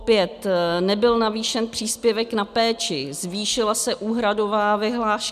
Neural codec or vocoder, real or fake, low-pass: none; real; 14.4 kHz